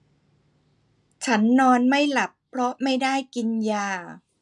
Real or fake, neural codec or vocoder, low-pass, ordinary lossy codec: real; none; 10.8 kHz; none